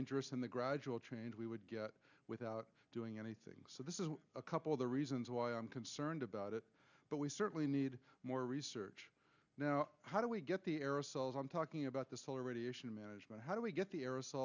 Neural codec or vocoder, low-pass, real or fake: none; 7.2 kHz; real